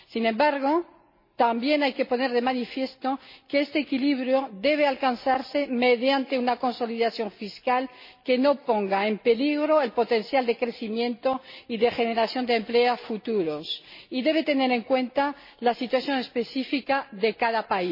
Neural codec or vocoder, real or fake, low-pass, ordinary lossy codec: none; real; 5.4 kHz; MP3, 24 kbps